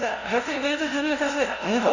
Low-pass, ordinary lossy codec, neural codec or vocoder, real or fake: 7.2 kHz; none; codec, 16 kHz, 0.5 kbps, FunCodec, trained on LibriTTS, 25 frames a second; fake